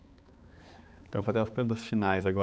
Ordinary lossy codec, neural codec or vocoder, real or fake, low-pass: none; codec, 16 kHz, 4 kbps, X-Codec, HuBERT features, trained on balanced general audio; fake; none